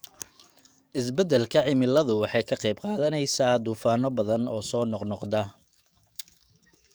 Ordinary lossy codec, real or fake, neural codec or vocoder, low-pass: none; fake; codec, 44.1 kHz, 7.8 kbps, DAC; none